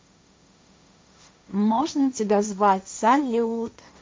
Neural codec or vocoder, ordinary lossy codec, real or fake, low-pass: codec, 16 kHz, 1.1 kbps, Voila-Tokenizer; none; fake; none